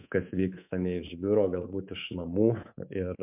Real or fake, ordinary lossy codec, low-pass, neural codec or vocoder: fake; MP3, 32 kbps; 3.6 kHz; codec, 24 kHz, 3.1 kbps, DualCodec